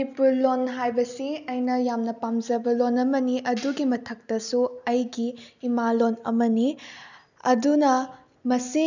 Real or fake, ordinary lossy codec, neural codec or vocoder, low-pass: real; none; none; 7.2 kHz